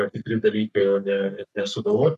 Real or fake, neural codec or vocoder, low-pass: fake; codec, 44.1 kHz, 3.4 kbps, Pupu-Codec; 14.4 kHz